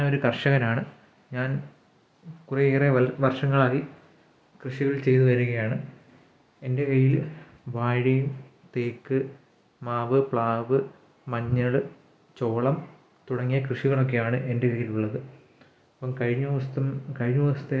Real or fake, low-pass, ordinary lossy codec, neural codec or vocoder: real; none; none; none